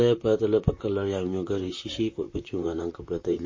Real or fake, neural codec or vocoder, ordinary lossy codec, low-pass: fake; vocoder, 44.1 kHz, 128 mel bands, Pupu-Vocoder; MP3, 32 kbps; 7.2 kHz